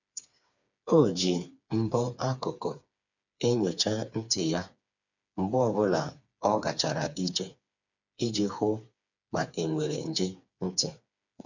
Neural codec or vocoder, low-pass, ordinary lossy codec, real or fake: codec, 16 kHz, 4 kbps, FreqCodec, smaller model; 7.2 kHz; none; fake